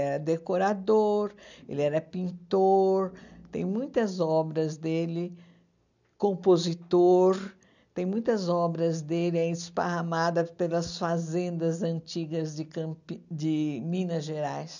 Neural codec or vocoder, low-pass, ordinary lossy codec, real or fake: none; 7.2 kHz; none; real